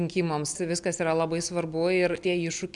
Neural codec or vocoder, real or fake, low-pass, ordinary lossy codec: none; real; 10.8 kHz; MP3, 96 kbps